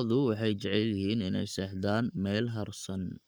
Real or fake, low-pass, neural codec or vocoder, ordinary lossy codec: fake; none; codec, 44.1 kHz, 7.8 kbps, Pupu-Codec; none